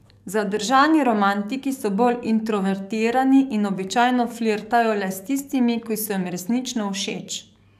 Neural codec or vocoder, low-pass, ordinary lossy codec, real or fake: codec, 44.1 kHz, 7.8 kbps, DAC; 14.4 kHz; none; fake